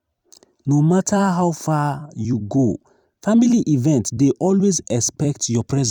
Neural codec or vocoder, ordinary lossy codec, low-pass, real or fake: vocoder, 44.1 kHz, 128 mel bands every 256 samples, BigVGAN v2; none; 19.8 kHz; fake